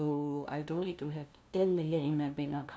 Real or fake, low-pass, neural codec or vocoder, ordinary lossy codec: fake; none; codec, 16 kHz, 0.5 kbps, FunCodec, trained on LibriTTS, 25 frames a second; none